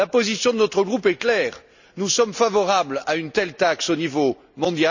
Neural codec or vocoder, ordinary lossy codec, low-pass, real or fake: none; none; 7.2 kHz; real